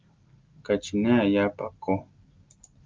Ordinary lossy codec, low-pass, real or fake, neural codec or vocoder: Opus, 24 kbps; 7.2 kHz; real; none